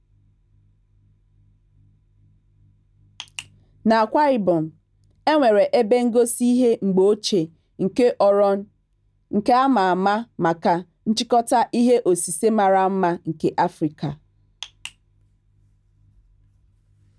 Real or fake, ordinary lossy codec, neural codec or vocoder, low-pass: real; none; none; none